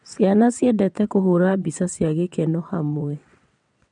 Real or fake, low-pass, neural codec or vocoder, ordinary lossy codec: fake; 9.9 kHz; vocoder, 22.05 kHz, 80 mel bands, WaveNeXt; none